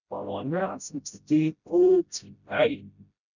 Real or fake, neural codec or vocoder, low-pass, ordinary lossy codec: fake; codec, 16 kHz, 0.5 kbps, FreqCodec, smaller model; 7.2 kHz; none